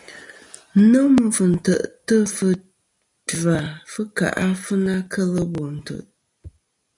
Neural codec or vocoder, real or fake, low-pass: none; real; 10.8 kHz